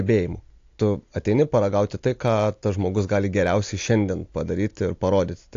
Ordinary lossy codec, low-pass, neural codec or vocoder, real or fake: AAC, 48 kbps; 7.2 kHz; none; real